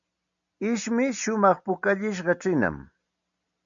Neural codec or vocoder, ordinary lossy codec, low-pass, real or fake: none; MP3, 96 kbps; 7.2 kHz; real